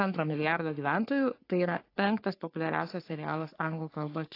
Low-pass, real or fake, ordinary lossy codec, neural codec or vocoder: 5.4 kHz; fake; AAC, 32 kbps; codec, 44.1 kHz, 3.4 kbps, Pupu-Codec